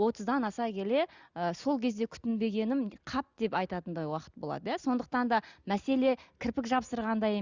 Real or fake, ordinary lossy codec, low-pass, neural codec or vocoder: real; Opus, 64 kbps; 7.2 kHz; none